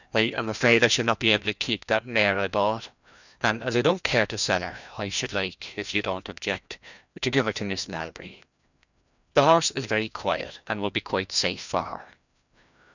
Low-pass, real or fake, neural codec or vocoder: 7.2 kHz; fake; codec, 16 kHz, 1 kbps, FreqCodec, larger model